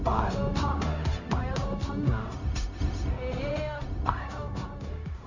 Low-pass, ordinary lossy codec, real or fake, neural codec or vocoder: 7.2 kHz; none; fake; codec, 16 kHz, 0.4 kbps, LongCat-Audio-Codec